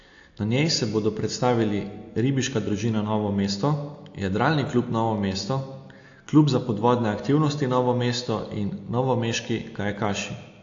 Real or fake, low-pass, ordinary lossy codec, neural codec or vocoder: real; 7.2 kHz; AAC, 48 kbps; none